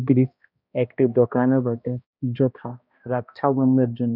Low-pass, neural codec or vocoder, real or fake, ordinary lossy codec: 5.4 kHz; codec, 16 kHz, 1 kbps, X-Codec, HuBERT features, trained on balanced general audio; fake; none